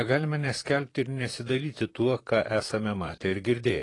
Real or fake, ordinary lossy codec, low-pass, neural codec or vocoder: fake; AAC, 32 kbps; 10.8 kHz; vocoder, 44.1 kHz, 128 mel bands, Pupu-Vocoder